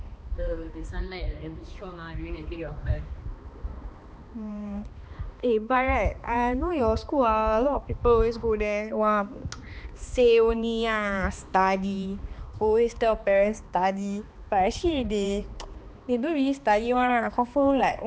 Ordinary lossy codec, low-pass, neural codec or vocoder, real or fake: none; none; codec, 16 kHz, 2 kbps, X-Codec, HuBERT features, trained on balanced general audio; fake